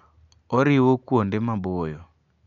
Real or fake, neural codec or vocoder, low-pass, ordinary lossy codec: real; none; 7.2 kHz; none